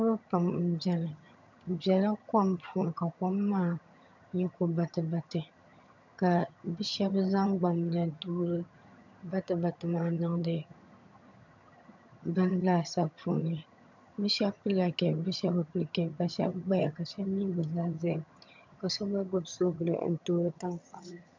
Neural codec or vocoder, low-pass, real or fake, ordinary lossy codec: vocoder, 22.05 kHz, 80 mel bands, HiFi-GAN; 7.2 kHz; fake; MP3, 64 kbps